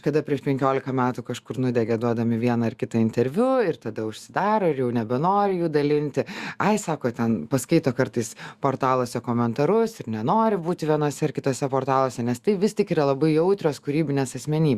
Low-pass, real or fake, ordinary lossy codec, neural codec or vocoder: 14.4 kHz; fake; Opus, 64 kbps; autoencoder, 48 kHz, 128 numbers a frame, DAC-VAE, trained on Japanese speech